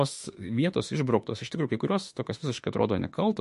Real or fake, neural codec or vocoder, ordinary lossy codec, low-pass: fake; autoencoder, 48 kHz, 32 numbers a frame, DAC-VAE, trained on Japanese speech; MP3, 48 kbps; 14.4 kHz